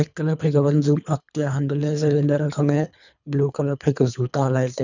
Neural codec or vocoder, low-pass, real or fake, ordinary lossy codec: codec, 24 kHz, 3 kbps, HILCodec; 7.2 kHz; fake; none